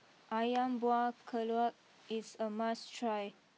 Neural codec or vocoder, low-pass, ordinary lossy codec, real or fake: none; none; none; real